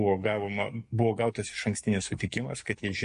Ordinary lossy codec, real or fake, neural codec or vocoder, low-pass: MP3, 48 kbps; fake; codec, 44.1 kHz, 7.8 kbps, DAC; 14.4 kHz